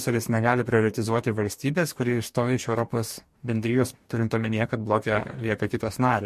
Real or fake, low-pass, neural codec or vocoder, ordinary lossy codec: fake; 14.4 kHz; codec, 44.1 kHz, 2.6 kbps, DAC; MP3, 64 kbps